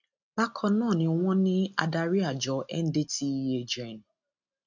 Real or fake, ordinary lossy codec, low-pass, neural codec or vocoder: real; none; 7.2 kHz; none